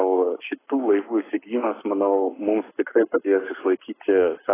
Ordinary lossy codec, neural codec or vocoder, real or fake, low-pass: AAC, 16 kbps; codec, 44.1 kHz, 7.8 kbps, Pupu-Codec; fake; 3.6 kHz